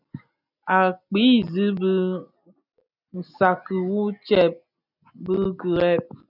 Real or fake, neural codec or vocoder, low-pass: real; none; 5.4 kHz